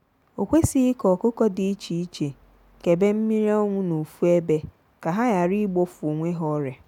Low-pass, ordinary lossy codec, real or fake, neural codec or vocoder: 19.8 kHz; none; real; none